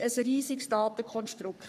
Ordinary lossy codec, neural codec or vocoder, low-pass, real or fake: none; codec, 44.1 kHz, 3.4 kbps, Pupu-Codec; 14.4 kHz; fake